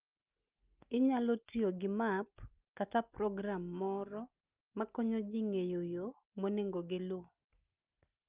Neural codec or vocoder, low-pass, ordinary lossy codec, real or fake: none; 3.6 kHz; Opus, 24 kbps; real